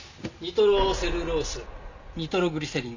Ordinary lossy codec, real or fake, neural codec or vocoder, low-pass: none; real; none; 7.2 kHz